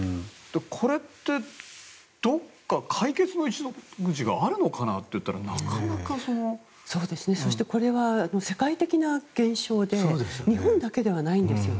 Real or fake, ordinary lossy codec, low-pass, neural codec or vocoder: real; none; none; none